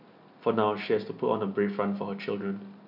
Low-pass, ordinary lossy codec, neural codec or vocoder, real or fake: 5.4 kHz; none; none; real